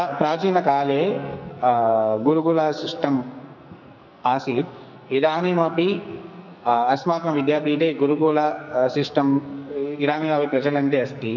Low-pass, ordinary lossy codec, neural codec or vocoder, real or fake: 7.2 kHz; none; codec, 44.1 kHz, 2.6 kbps, SNAC; fake